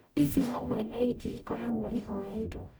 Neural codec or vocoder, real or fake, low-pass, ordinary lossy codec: codec, 44.1 kHz, 0.9 kbps, DAC; fake; none; none